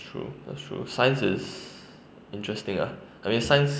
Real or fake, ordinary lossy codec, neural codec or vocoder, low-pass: real; none; none; none